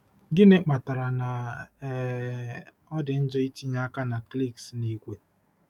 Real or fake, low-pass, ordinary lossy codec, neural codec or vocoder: fake; 19.8 kHz; none; codec, 44.1 kHz, 7.8 kbps, DAC